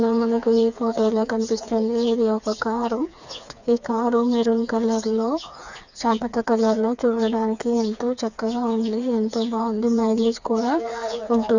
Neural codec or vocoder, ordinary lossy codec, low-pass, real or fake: codec, 16 kHz, 4 kbps, FreqCodec, smaller model; none; 7.2 kHz; fake